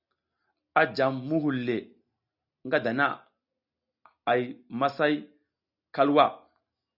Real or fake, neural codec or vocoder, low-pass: real; none; 5.4 kHz